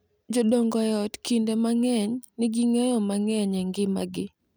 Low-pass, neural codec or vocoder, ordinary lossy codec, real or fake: none; none; none; real